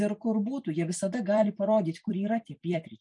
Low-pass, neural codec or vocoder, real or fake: 10.8 kHz; vocoder, 44.1 kHz, 128 mel bands every 256 samples, BigVGAN v2; fake